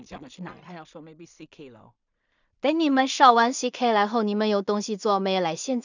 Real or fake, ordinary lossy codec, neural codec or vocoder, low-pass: fake; none; codec, 16 kHz in and 24 kHz out, 0.4 kbps, LongCat-Audio-Codec, two codebook decoder; 7.2 kHz